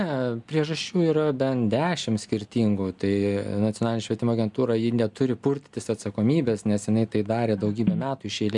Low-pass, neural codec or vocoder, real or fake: 10.8 kHz; none; real